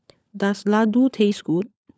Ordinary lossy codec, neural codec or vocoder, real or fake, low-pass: none; codec, 16 kHz, 16 kbps, FunCodec, trained on LibriTTS, 50 frames a second; fake; none